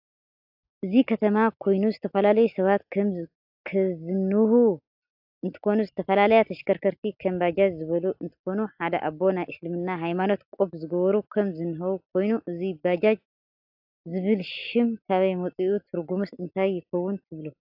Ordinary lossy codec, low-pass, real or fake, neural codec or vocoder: AAC, 48 kbps; 5.4 kHz; real; none